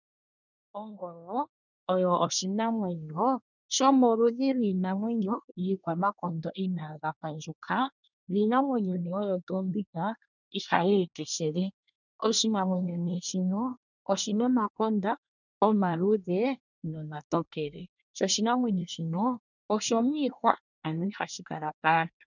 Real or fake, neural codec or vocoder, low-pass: fake; codec, 24 kHz, 1 kbps, SNAC; 7.2 kHz